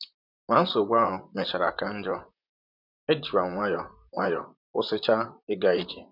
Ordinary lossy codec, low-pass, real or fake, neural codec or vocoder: none; 5.4 kHz; fake; vocoder, 44.1 kHz, 128 mel bands, Pupu-Vocoder